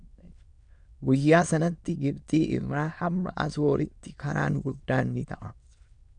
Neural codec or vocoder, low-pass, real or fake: autoencoder, 22.05 kHz, a latent of 192 numbers a frame, VITS, trained on many speakers; 9.9 kHz; fake